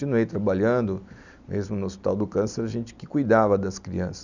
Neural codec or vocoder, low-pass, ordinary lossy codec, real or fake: none; 7.2 kHz; none; real